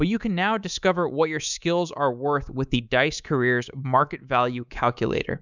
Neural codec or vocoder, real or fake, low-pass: codec, 24 kHz, 3.1 kbps, DualCodec; fake; 7.2 kHz